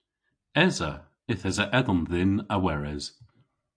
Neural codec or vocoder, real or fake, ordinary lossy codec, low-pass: none; real; AAC, 64 kbps; 9.9 kHz